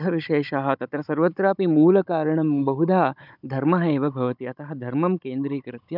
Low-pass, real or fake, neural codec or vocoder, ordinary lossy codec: 5.4 kHz; fake; codec, 16 kHz, 16 kbps, FunCodec, trained on Chinese and English, 50 frames a second; none